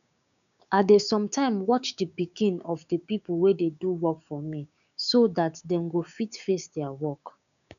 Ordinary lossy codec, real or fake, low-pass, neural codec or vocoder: none; fake; 7.2 kHz; codec, 16 kHz, 6 kbps, DAC